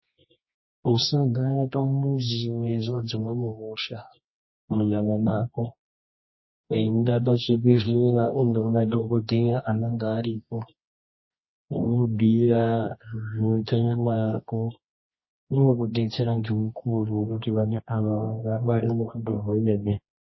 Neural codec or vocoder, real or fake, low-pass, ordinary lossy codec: codec, 24 kHz, 0.9 kbps, WavTokenizer, medium music audio release; fake; 7.2 kHz; MP3, 24 kbps